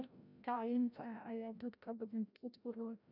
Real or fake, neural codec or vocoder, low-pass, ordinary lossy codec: fake; codec, 16 kHz, 0.5 kbps, FreqCodec, larger model; 5.4 kHz; none